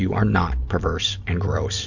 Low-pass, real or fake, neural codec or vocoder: 7.2 kHz; real; none